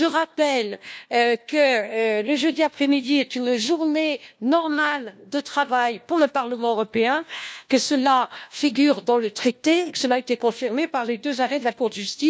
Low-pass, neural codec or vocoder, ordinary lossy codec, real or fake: none; codec, 16 kHz, 1 kbps, FunCodec, trained on LibriTTS, 50 frames a second; none; fake